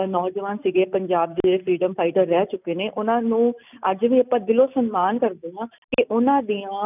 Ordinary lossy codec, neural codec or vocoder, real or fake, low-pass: none; none; real; 3.6 kHz